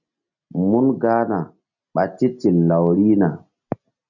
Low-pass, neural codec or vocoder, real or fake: 7.2 kHz; none; real